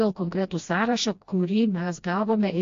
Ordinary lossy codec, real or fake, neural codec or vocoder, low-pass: AAC, 48 kbps; fake; codec, 16 kHz, 1 kbps, FreqCodec, smaller model; 7.2 kHz